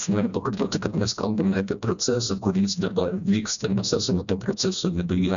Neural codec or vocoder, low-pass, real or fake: codec, 16 kHz, 1 kbps, FreqCodec, smaller model; 7.2 kHz; fake